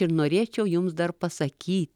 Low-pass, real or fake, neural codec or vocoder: 19.8 kHz; real; none